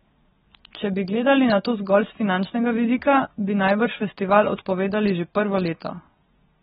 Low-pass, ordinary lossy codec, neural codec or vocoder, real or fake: 19.8 kHz; AAC, 16 kbps; none; real